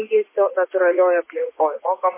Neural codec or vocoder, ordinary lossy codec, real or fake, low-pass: vocoder, 24 kHz, 100 mel bands, Vocos; MP3, 16 kbps; fake; 3.6 kHz